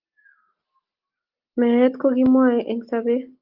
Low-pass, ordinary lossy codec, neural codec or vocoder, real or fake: 5.4 kHz; Opus, 32 kbps; none; real